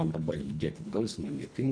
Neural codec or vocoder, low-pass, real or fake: codec, 24 kHz, 1.5 kbps, HILCodec; 9.9 kHz; fake